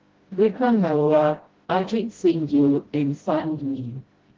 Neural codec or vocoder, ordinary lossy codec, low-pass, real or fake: codec, 16 kHz, 0.5 kbps, FreqCodec, smaller model; Opus, 16 kbps; 7.2 kHz; fake